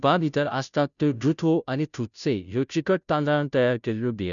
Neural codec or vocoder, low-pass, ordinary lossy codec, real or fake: codec, 16 kHz, 0.5 kbps, FunCodec, trained on Chinese and English, 25 frames a second; 7.2 kHz; none; fake